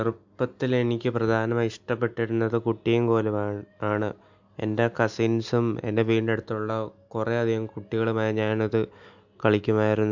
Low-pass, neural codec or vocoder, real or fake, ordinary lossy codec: 7.2 kHz; none; real; MP3, 64 kbps